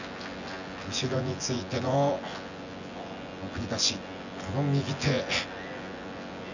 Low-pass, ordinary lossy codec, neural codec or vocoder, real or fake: 7.2 kHz; none; vocoder, 24 kHz, 100 mel bands, Vocos; fake